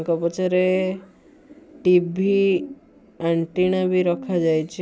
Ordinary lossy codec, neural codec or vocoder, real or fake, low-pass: none; none; real; none